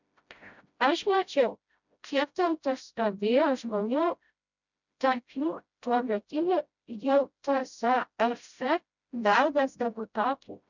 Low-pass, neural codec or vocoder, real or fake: 7.2 kHz; codec, 16 kHz, 0.5 kbps, FreqCodec, smaller model; fake